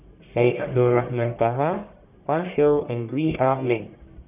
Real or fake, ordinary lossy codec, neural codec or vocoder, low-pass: fake; none; codec, 44.1 kHz, 1.7 kbps, Pupu-Codec; 3.6 kHz